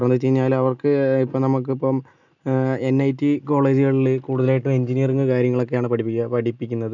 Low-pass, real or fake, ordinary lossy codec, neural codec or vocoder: 7.2 kHz; real; none; none